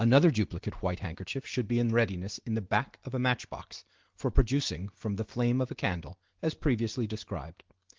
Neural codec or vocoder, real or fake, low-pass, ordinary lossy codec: none; real; 7.2 kHz; Opus, 16 kbps